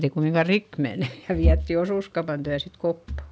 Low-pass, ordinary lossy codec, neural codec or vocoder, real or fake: none; none; none; real